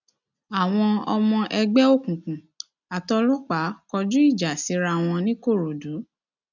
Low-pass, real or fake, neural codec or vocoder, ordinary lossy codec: 7.2 kHz; real; none; none